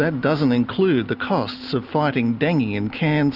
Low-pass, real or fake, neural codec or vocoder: 5.4 kHz; real; none